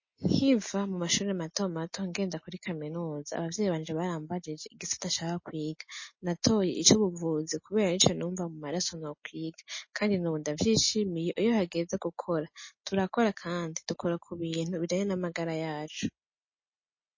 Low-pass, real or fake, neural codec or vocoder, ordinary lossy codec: 7.2 kHz; real; none; MP3, 32 kbps